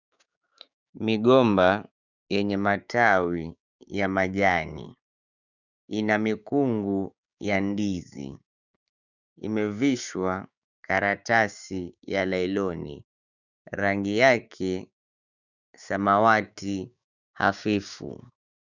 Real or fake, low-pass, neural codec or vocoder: fake; 7.2 kHz; codec, 16 kHz, 6 kbps, DAC